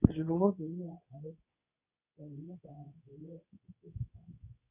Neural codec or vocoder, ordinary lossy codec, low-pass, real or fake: codec, 24 kHz, 0.9 kbps, WavTokenizer, medium speech release version 2; none; 3.6 kHz; fake